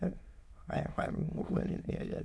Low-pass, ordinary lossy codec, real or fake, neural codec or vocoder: none; none; fake; autoencoder, 22.05 kHz, a latent of 192 numbers a frame, VITS, trained on many speakers